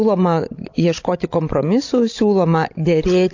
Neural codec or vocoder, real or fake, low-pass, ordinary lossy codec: codec, 16 kHz, 16 kbps, FreqCodec, larger model; fake; 7.2 kHz; AAC, 48 kbps